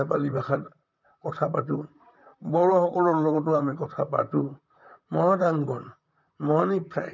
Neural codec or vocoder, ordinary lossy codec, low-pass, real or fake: vocoder, 44.1 kHz, 128 mel bands, Pupu-Vocoder; MP3, 64 kbps; 7.2 kHz; fake